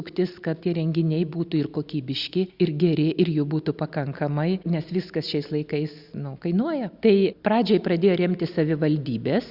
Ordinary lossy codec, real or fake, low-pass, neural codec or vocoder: Opus, 64 kbps; real; 5.4 kHz; none